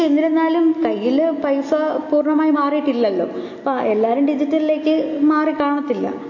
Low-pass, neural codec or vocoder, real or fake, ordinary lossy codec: 7.2 kHz; none; real; MP3, 32 kbps